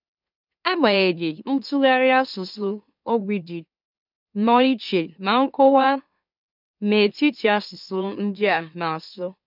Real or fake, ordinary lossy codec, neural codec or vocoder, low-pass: fake; none; autoencoder, 44.1 kHz, a latent of 192 numbers a frame, MeloTTS; 5.4 kHz